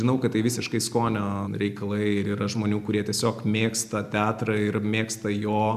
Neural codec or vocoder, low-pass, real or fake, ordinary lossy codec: none; 14.4 kHz; real; MP3, 96 kbps